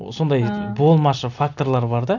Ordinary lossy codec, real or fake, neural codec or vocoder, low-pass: none; real; none; 7.2 kHz